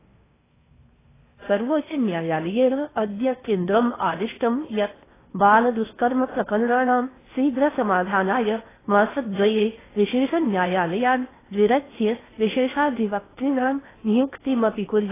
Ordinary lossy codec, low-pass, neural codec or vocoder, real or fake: AAC, 16 kbps; 3.6 kHz; codec, 16 kHz in and 24 kHz out, 0.6 kbps, FocalCodec, streaming, 4096 codes; fake